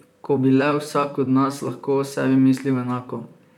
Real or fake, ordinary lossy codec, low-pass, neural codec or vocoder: fake; none; 19.8 kHz; vocoder, 44.1 kHz, 128 mel bands, Pupu-Vocoder